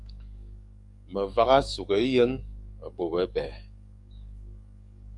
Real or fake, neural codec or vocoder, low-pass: fake; codec, 44.1 kHz, 7.8 kbps, DAC; 10.8 kHz